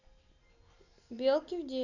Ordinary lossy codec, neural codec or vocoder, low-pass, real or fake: none; none; 7.2 kHz; real